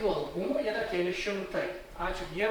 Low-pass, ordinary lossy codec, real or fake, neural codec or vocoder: 19.8 kHz; Opus, 64 kbps; fake; vocoder, 44.1 kHz, 128 mel bands, Pupu-Vocoder